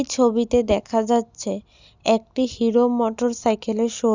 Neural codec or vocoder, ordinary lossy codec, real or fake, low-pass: none; Opus, 64 kbps; real; 7.2 kHz